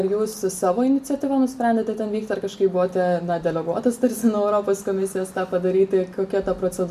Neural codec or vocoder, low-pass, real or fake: none; 14.4 kHz; real